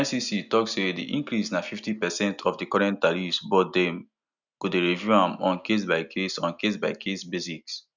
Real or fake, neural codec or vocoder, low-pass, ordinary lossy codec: real; none; 7.2 kHz; none